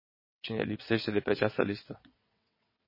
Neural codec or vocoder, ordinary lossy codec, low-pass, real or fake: vocoder, 22.05 kHz, 80 mel bands, WaveNeXt; MP3, 24 kbps; 5.4 kHz; fake